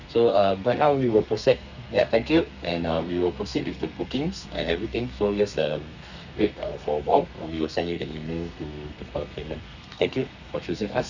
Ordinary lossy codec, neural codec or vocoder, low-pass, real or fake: none; codec, 32 kHz, 1.9 kbps, SNAC; 7.2 kHz; fake